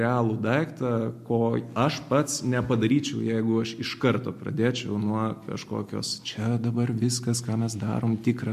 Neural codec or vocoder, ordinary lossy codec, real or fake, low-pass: none; MP3, 64 kbps; real; 14.4 kHz